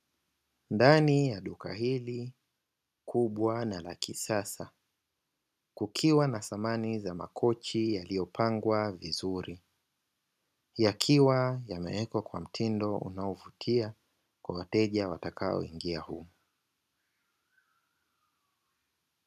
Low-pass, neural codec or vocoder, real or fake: 14.4 kHz; none; real